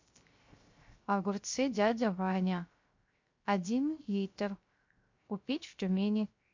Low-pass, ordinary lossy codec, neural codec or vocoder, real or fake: 7.2 kHz; MP3, 48 kbps; codec, 16 kHz, 0.3 kbps, FocalCodec; fake